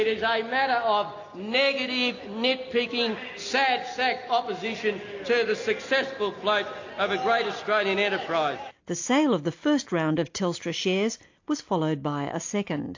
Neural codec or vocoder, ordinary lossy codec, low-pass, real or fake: none; AAC, 48 kbps; 7.2 kHz; real